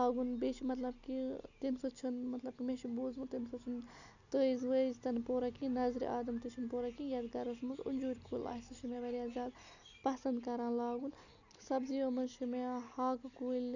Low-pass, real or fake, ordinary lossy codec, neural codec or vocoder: 7.2 kHz; real; none; none